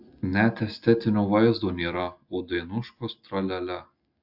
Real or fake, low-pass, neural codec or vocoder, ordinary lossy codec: real; 5.4 kHz; none; AAC, 48 kbps